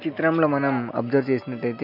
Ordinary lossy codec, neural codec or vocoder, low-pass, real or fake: MP3, 48 kbps; none; 5.4 kHz; real